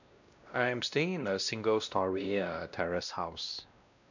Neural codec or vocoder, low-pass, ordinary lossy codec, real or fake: codec, 16 kHz, 1 kbps, X-Codec, WavLM features, trained on Multilingual LibriSpeech; 7.2 kHz; none; fake